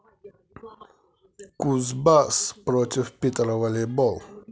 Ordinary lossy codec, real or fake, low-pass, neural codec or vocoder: none; real; none; none